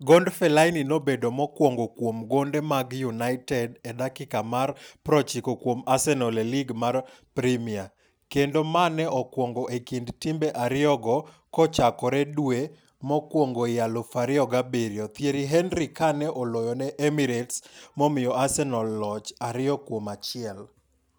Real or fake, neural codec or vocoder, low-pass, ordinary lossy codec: real; none; none; none